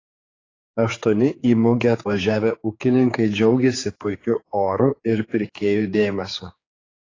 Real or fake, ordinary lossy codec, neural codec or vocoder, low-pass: fake; AAC, 32 kbps; codec, 16 kHz, 4 kbps, X-Codec, HuBERT features, trained on general audio; 7.2 kHz